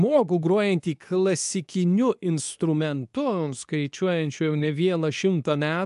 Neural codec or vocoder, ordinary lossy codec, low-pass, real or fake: codec, 24 kHz, 0.9 kbps, WavTokenizer, medium speech release version 2; AAC, 96 kbps; 10.8 kHz; fake